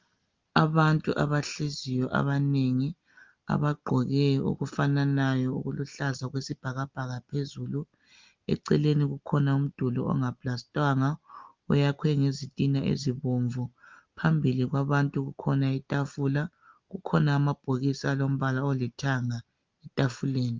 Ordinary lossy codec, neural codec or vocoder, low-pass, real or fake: Opus, 32 kbps; none; 7.2 kHz; real